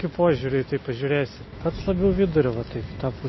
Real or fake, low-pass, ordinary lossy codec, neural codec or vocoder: real; 7.2 kHz; MP3, 24 kbps; none